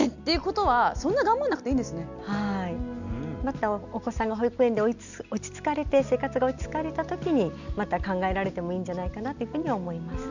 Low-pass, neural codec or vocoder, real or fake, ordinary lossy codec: 7.2 kHz; none; real; none